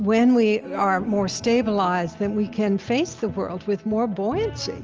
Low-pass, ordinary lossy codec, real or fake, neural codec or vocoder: 7.2 kHz; Opus, 24 kbps; real; none